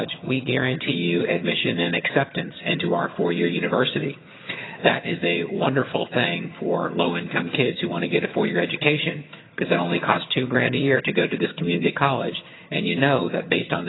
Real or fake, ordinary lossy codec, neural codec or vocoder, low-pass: fake; AAC, 16 kbps; vocoder, 22.05 kHz, 80 mel bands, HiFi-GAN; 7.2 kHz